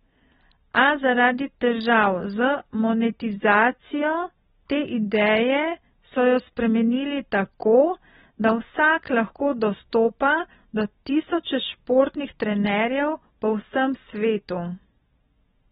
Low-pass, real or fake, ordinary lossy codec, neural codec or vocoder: 19.8 kHz; real; AAC, 16 kbps; none